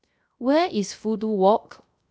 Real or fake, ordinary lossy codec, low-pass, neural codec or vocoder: fake; none; none; codec, 16 kHz, 0.3 kbps, FocalCodec